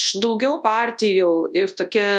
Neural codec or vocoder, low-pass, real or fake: codec, 24 kHz, 0.9 kbps, WavTokenizer, large speech release; 10.8 kHz; fake